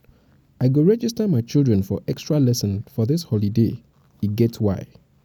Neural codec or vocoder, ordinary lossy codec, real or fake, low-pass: none; none; real; 19.8 kHz